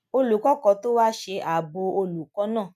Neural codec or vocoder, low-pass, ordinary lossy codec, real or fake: none; 14.4 kHz; none; real